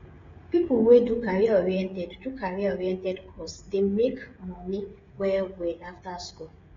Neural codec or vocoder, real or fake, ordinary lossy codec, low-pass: codec, 16 kHz, 16 kbps, FreqCodec, smaller model; fake; AAC, 32 kbps; 7.2 kHz